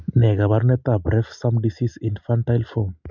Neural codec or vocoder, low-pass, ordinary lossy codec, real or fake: none; 7.2 kHz; MP3, 64 kbps; real